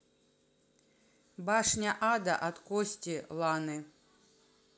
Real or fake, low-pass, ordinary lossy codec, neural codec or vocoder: real; none; none; none